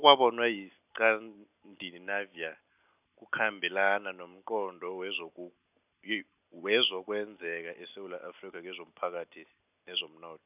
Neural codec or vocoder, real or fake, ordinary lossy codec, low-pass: none; real; none; 3.6 kHz